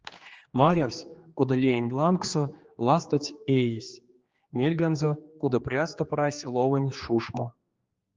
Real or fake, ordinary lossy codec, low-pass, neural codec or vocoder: fake; Opus, 24 kbps; 7.2 kHz; codec, 16 kHz, 2 kbps, X-Codec, HuBERT features, trained on general audio